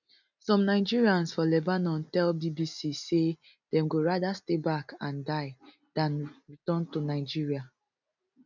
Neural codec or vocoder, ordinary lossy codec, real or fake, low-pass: none; none; real; 7.2 kHz